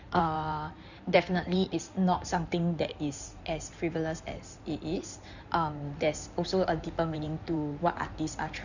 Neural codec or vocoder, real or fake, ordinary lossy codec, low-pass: codec, 16 kHz in and 24 kHz out, 2.2 kbps, FireRedTTS-2 codec; fake; none; 7.2 kHz